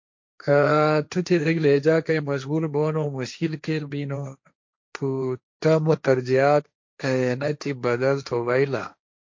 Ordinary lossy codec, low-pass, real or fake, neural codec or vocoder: MP3, 48 kbps; 7.2 kHz; fake; codec, 16 kHz, 1.1 kbps, Voila-Tokenizer